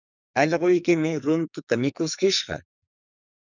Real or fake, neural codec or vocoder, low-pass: fake; codec, 44.1 kHz, 2.6 kbps, SNAC; 7.2 kHz